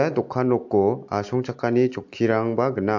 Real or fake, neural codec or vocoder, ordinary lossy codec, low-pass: real; none; MP3, 48 kbps; 7.2 kHz